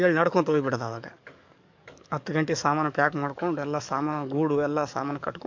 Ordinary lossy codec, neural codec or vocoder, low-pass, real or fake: MP3, 64 kbps; codec, 44.1 kHz, 7.8 kbps, DAC; 7.2 kHz; fake